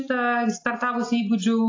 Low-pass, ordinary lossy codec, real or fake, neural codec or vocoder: 7.2 kHz; AAC, 32 kbps; real; none